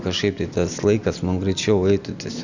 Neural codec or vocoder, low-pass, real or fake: none; 7.2 kHz; real